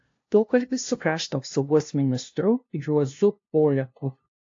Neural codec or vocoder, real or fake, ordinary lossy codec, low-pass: codec, 16 kHz, 0.5 kbps, FunCodec, trained on LibriTTS, 25 frames a second; fake; AAC, 48 kbps; 7.2 kHz